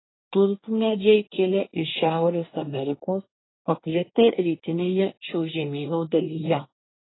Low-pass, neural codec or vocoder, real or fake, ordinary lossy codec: 7.2 kHz; codec, 24 kHz, 1 kbps, SNAC; fake; AAC, 16 kbps